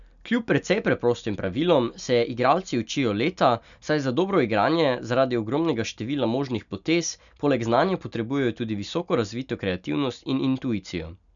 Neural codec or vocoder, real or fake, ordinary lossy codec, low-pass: none; real; none; 7.2 kHz